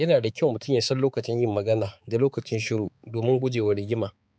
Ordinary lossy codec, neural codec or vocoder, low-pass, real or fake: none; codec, 16 kHz, 4 kbps, X-Codec, HuBERT features, trained on balanced general audio; none; fake